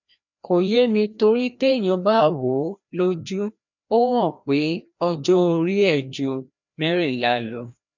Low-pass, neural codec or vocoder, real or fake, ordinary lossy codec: 7.2 kHz; codec, 16 kHz, 1 kbps, FreqCodec, larger model; fake; none